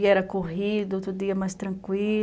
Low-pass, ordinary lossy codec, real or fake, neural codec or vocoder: none; none; real; none